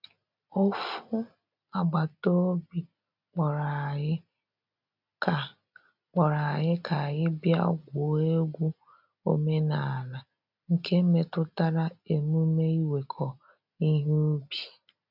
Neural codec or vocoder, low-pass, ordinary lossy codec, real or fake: none; 5.4 kHz; none; real